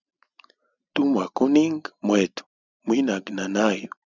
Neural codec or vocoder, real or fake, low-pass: none; real; 7.2 kHz